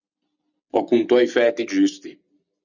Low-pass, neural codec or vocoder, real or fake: 7.2 kHz; none; real